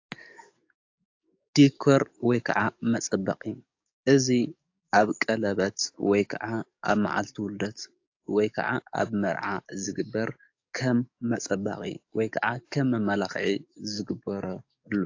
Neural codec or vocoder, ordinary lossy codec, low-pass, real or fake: codec, 44.1 kHz, 7.8 kbps, DAC; AAC, 48 kbps; 7.2 kHz; fake